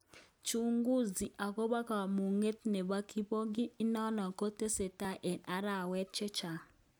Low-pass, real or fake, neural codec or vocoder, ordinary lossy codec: none; real; none; none